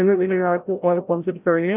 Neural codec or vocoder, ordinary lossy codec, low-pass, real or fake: codec, 16 kHz, 0.5 kbps, FreqCodec, larger model; none; 3.6 kHz; fake